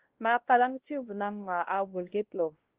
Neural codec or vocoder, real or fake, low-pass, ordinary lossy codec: codec, 16 kHz, 1 kbps, X-Codec, WavLM features, trained on Multilingual LibriSpeech; fake; 3.6 kHz; Opus, 16 kbps